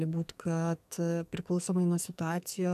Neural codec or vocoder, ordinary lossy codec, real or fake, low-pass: codec, 44.1 kHz, 2.6 kbps, SNAC; AAC, 96 kbps; fake; 14.4 kHz